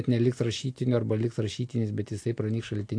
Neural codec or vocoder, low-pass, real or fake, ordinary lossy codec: none; 9.9 kHz; real; AAC, 48 kbps